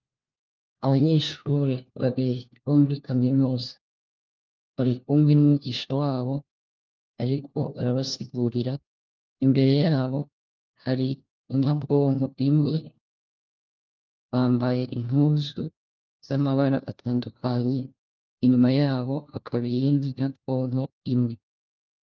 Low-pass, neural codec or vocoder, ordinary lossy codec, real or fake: 7.2 kHz; codec, 16 kHz, 1 kbps, FunCodec, trained on LibriTTS, 50 frames a second; Opus, 24 kbps; fake